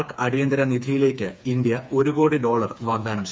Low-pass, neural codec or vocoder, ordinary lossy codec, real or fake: none; codec, 16 kHz, 4 kbps, FreqCodec, smaller model; none; fake